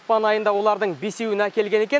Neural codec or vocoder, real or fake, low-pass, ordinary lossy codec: none; real; none; none